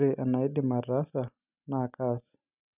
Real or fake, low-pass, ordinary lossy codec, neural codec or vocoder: real; 3.6 kHz; none; none